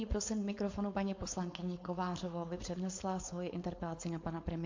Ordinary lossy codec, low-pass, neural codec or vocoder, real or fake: MP3, 48 kbps; 7.2 kHz; codec, 16 kHz, 4.8 kbps, FACodec; fake